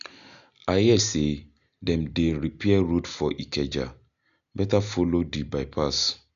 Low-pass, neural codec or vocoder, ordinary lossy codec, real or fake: 7.2 kHz; none; none; real